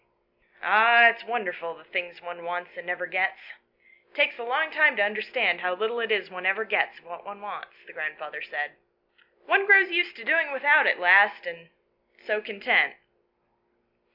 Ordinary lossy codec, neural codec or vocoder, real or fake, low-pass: MP3, 48 kbps; none; real; 5.4 kHz